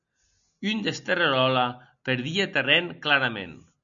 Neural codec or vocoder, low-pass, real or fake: none; 7.2 kHz; real